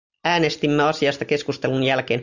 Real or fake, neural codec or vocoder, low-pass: real; none; 7.2 kHz